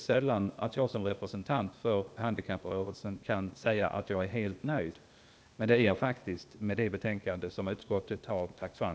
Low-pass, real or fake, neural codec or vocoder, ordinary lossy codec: none; fake; codec, 16 kHz, 0.8 kbps, ZipCodec; none